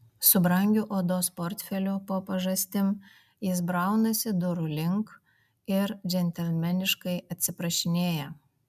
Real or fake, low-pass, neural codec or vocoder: real; 14.4 kHz; none